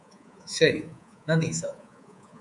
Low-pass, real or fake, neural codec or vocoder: 10.8 kHz; fake; codec, 24 kHz, 3.1 kbps, DualCodec